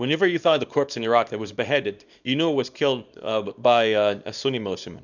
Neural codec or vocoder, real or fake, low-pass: codec, 24 kHz, 0.9 kbps, WavTokenizer, small release; fake; 7.2 kHz